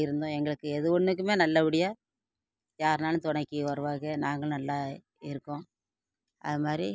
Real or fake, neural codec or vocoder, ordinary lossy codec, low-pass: real; none; none; none